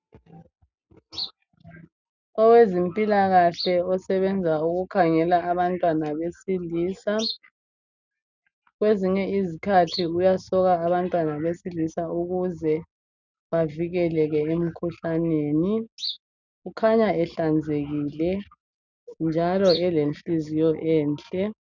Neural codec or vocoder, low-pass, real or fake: none; 7.2 kHz; real